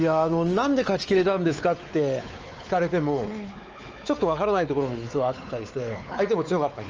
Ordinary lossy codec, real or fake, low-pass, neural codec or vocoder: Opus, 24 kbps; fake; 7.2 kHz; codec, 16 kHz, 4 kbps, X-Codec, WavLM features, trained on Multilingual LibriSpeech